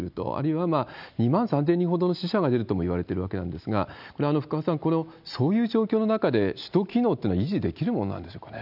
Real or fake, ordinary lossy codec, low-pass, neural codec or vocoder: real; none; 5.4 kHz; none